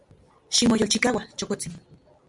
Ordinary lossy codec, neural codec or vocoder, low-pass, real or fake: MP3, 96 kbps; none; 10.8 kHz; real